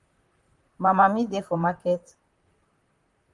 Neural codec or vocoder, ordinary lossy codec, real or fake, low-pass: vocoder, 44.1 kHz, 128 mel bands, Pupu-Vocoder; Opus, 24 kbps; fake; 10.8 kHz